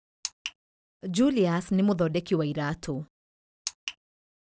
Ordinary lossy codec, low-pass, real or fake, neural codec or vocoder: none; none; real; none